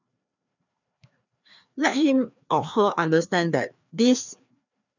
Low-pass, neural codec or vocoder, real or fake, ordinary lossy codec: 7.2 kHz; codec, 16 kHz, 2 kbps, FreqCodec, larger model; fake; none